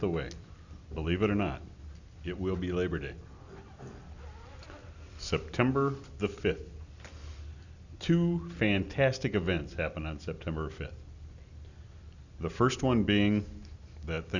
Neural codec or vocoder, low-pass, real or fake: none; 7.2 kHz; real